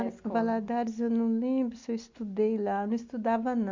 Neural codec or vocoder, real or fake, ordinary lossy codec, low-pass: none; real; MP3, 48 kbps; 7.2 kHz